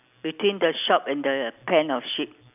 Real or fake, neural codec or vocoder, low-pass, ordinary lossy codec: real; none; 3.6 kHz; none